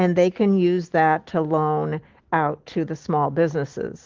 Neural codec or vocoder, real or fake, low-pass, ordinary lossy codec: none; real; 7.2 kHz; Opus, 16 kbps